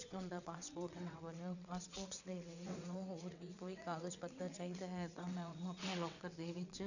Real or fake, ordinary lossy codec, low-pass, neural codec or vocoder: fake; none; 7.2 kHz; vocoder, 22.05 kHz, 80 mel bands, WaveNeXt